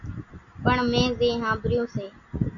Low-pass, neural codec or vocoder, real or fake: 7.2 kHz; none; real